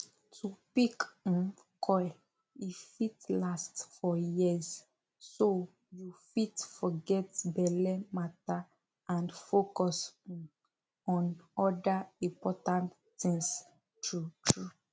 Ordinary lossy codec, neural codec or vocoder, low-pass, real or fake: none; none; none; real